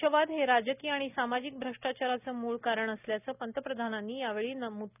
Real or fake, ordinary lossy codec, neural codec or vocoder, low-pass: real; none; none; 3.6 kHz